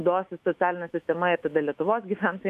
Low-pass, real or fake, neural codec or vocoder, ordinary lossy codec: 14.4 kHz; fake; autoencoder, 48 kHz, 128 numbers a frame, DAC-VAE, trained on Japanese speech; MP3, 64 kbps